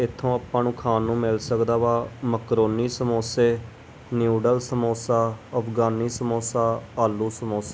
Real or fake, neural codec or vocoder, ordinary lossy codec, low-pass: real; none; none; none